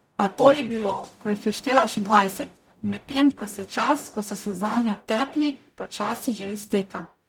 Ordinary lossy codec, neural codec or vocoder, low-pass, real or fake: none; codec, 44.1 kHz, 0.9 kbps, DAC; 19.8 kHz; fake